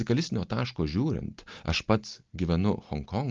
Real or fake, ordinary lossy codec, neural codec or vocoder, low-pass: real; Opus, 24 kbps; none; 7.2 kHz